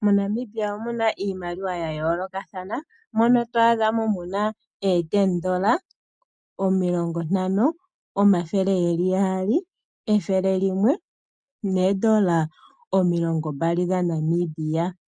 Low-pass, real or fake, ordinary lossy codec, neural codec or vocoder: 9.9 kHz; real; MP3, 64 kbps; none